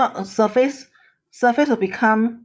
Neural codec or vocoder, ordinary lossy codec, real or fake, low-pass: codec, 16 kHz, 8 kbps, FreqCodec, larger model; none; fake; none